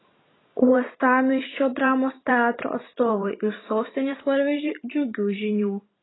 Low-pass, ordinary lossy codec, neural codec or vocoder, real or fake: 7.2 kHz; AAC, 16 kbps; vocoder, 44.1 kHz, 128 mel bands every 512 samples, BigVGAN v2; fake